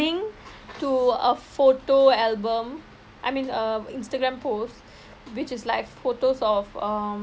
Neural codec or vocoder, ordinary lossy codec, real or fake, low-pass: none; none; real; none